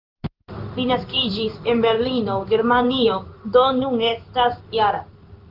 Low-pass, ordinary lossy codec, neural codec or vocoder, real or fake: 5.4 kHz; Opus, 32 kbps; codec, 16 kHz in and 24 kHz out, 1 kbps, XY-Tokenizer; fake